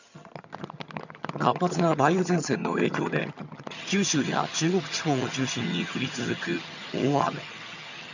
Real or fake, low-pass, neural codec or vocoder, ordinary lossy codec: fake; 7.2 kHz; vocoder, 22.05 kHz, 80 mel bands, HiFi-GAN; none